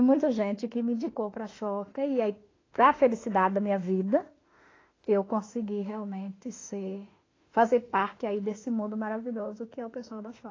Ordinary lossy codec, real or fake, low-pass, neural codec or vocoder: AAC, 32 kbps; fake; 7.2 kHz; autoencoder, 48 kHz, 32 numbers a frame, DAC-VAE, trained on Japanese speech